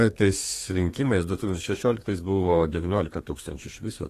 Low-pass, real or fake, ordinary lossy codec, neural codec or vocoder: 14.4 kHz; fake; AAC, 48 kbps; codec, 32 kHz, 1.9 kbps, SNAC